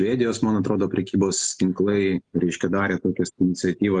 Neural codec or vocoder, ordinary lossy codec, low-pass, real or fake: none; Opus, 32 kbps; 9.9 kHz; real